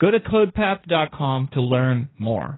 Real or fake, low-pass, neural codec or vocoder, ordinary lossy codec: fake; 7.2 kHz; codec, 16 kHz, 1.1 kbps, Voila-Tokenizer; AAC, 16 kbps